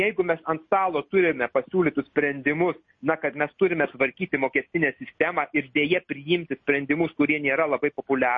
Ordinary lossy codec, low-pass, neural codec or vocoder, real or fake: MP3, 32 kbps; 7.2 kHz; none; real